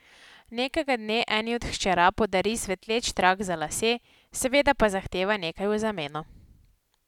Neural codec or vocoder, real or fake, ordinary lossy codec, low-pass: none; real; none; 19.8 kHz